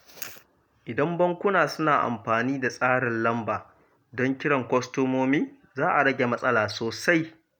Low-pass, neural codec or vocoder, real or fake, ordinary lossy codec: none; none; real; none